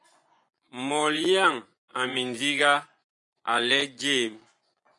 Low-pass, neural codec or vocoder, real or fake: 10.8 kHz; vocoder, 24 kHz, 100 mel bands, Vocos; fake